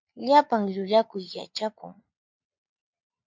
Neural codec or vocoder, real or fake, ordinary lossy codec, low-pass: vocoder, 22.05 kHz, 80 mel bands, Vocos; fake; MP3, 64 kbps; 7.2 kHz